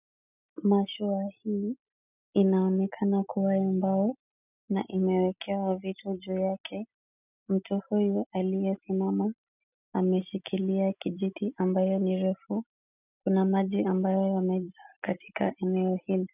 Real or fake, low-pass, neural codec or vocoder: real; 3.6 kHz; none